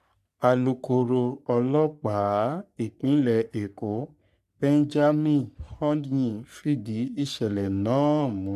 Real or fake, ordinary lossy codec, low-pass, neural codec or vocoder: fake; none; 14.4 kHz; codec, 44.1 kHz, 3.4 kbps, Pupu-Codec